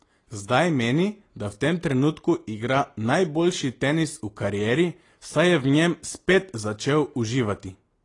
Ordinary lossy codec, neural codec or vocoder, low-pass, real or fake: AAC, 32 kbps; none; 10.8 kHz; real